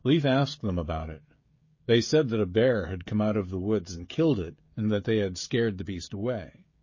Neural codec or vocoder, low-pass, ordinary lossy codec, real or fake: codec, 16 kHz, 16 kbps, FreqCodec, smaller model; 7.2 kHz; MP3, 32 kbps; fake